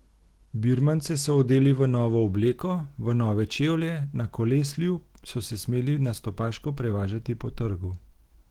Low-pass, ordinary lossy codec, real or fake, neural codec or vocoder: 19.8 kHz; Opus, 16 kbps; fake; autoencoder, 48 kHz, 128 numbers a frame, DAC-VAE, trained on Japanese speech